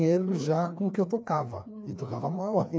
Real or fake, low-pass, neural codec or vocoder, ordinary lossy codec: fake; none; codec, 16 kHz, 2 kbps, FreqCodec, larger model; none